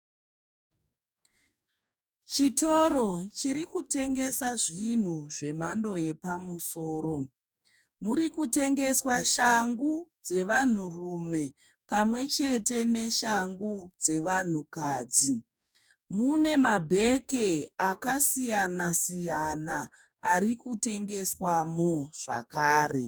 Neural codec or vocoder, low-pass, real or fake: codec, 44.1 kHz, 2.6 kbps, DAC; 19.8 kHz; fake